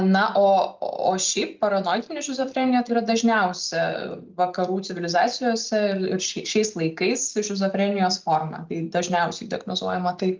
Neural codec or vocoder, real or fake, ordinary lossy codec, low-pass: none; real; Opus, 32 kbps; 7.2 kHz